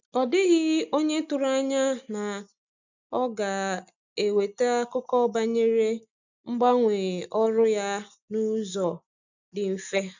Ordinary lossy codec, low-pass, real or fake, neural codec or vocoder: none; 7.2 kHz; real; none